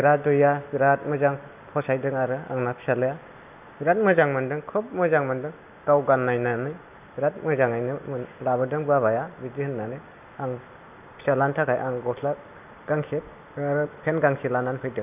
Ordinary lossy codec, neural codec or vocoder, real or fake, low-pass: none; none; real; 3.6 kHz